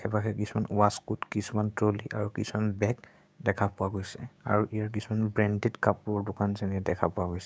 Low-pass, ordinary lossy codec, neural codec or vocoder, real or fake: none; none; codec, 16 kHz, 6 kbps, DAC; fake